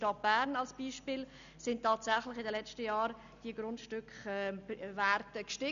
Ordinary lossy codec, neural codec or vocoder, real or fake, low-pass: none; none; real; 7.2 kHz